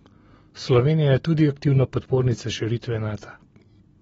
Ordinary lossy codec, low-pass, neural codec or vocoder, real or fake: AAC, 24 kbps; 10.8 kHz; none; real